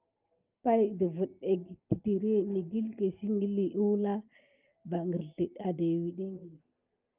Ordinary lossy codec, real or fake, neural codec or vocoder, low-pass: Opus, 32 kbps; real; none; 3.6 kHz